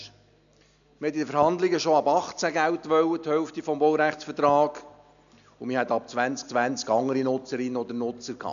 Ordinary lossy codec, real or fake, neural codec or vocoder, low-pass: MP3, 96 kbps; real; none; 7.2 kHz